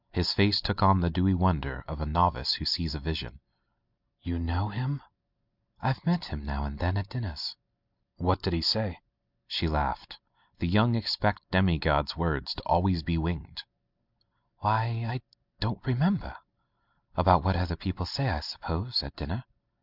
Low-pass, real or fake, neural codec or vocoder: 5.4 kHz; real; none